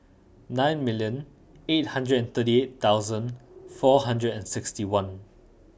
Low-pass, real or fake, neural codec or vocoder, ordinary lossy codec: none; real; none; none